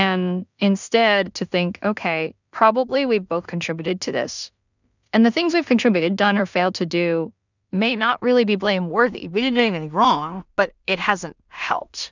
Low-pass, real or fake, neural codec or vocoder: 7.2 kHz; fake; codec, 16 kHz in and 24 kHz out, 0.4 kbps, LongCat-Audio-Codec, two codebook decoder